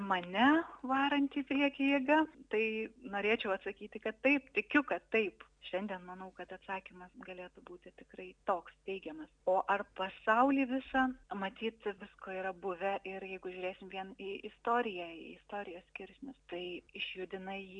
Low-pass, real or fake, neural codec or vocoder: 9.9 kHz; real; none